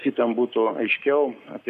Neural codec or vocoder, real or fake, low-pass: codec, 44.1 kHz, 7.8 kbps, Pupu-Codec; fake; 14.4 kHz